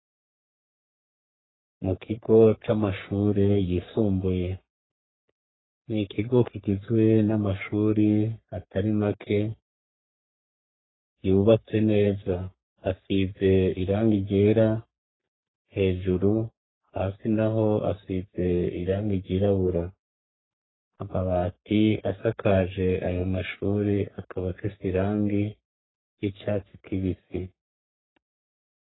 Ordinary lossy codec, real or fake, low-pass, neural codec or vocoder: AAC, 16 kbps; fake; 7.2 kHz; codec, 44.1 kHz, 3.4 kbps, Pupu-Codec